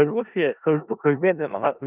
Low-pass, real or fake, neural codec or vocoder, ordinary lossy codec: 3.6 kHz; fake; codec, 16 kHz in and 24 kHz out, 0.4 kbps, LongCat-Audio-Codec, four codebook decoder; Opus, 24 kbps